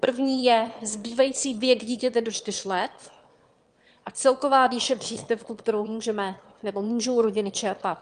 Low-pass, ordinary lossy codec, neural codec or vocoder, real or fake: 9.9 kHz; Opus, 32 kbps; autoencoder, 22.05 kHz, a latent of 192 numbers a frame, VITS, trained on one speaker; fake